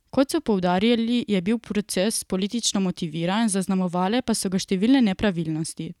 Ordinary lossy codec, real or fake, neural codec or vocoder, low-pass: none; real; none; 19.8 kHz